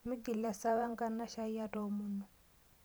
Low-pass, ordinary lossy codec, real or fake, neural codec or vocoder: none; none; fake; vocoder, 44.1 kHz, 128 mel bands every 512 samples, BigVGAN v2